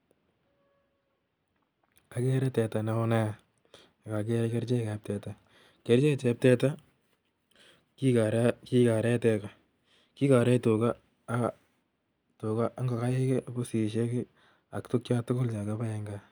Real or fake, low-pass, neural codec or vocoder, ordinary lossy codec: real; none; none; none